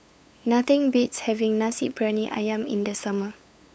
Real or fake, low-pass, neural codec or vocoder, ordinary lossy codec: fake; none; codec, 16 kHz, 8 kbps, FunCodec, trained on LibriTTS, 25 frames a second; none